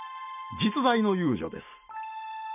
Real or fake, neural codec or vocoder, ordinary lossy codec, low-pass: real; none; none; 3.6 kHz